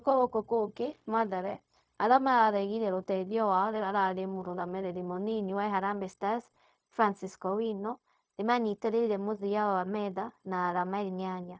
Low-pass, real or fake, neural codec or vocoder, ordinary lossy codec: none; fake; codec, 16 kHz, 0.4 kbps, LongCat-Audio-Codec; none